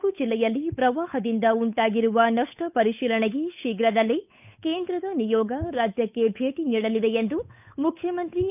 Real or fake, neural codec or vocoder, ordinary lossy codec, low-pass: fake; codec, 16 kHz, 8 kbps, FunCodec, trained on Chinese and English, 25 frames a second; none; 3.6 kHz